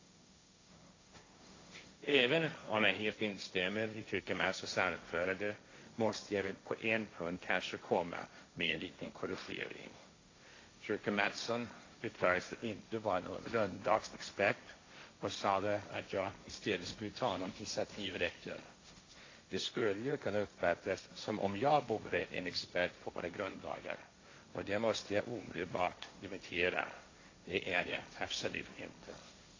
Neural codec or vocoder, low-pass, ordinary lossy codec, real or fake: codec, 16 kHz, 1.1 kbps, Voila-Tokenizer; 7.2 kHz; AAC, 32 kbps; fake